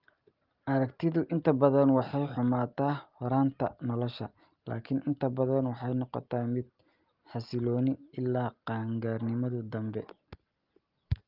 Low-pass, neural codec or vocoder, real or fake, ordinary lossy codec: 5.4 kHz; none; real; Opus, 32 kbps